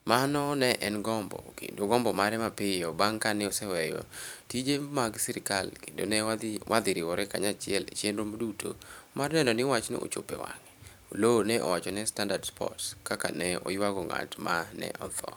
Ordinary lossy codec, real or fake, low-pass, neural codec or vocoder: none; fake; none; vocoder, 44.1 kHz, 128 mel bands every 512 samples, BigVGAN v2